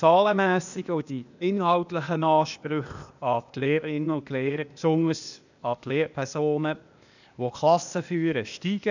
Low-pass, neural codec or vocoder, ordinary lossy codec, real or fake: 7.2 kHz; codec, 16 kHz, 0.8 kbps, ZipCodec; none; fake